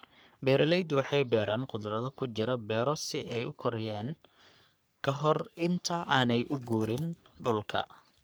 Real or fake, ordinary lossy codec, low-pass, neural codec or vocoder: fake; none; none; codec, 44.1 kHz, 3.4 kbps, Pupu-Codec